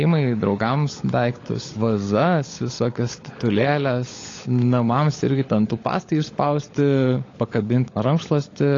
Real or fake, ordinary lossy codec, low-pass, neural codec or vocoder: fake; AAC, 32 kbps; 7.2 kHz; codec, 16 kHz, 16 kbps, FunCodec, trained on LibriTTS, 50 frames a second